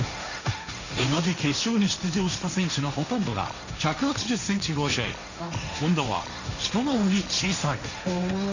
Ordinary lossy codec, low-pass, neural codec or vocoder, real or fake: none; 7.2 kHz; codec, 16 kHz, 1.1 kbps, Voila-Tokenizer; fake